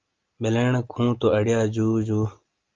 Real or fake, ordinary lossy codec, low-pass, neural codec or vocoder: real; Opus, 24 kbps; 7.2 kHz; none